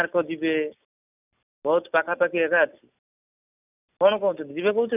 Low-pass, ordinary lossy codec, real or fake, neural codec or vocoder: 3.6 kHz; none; real; none